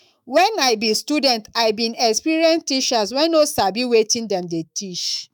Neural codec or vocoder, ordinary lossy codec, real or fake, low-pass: autoencoder, 48 kHz, 128 numbers a frame, DAC-VAE, trained on Japanese speech; none; fake; 19.8 kHz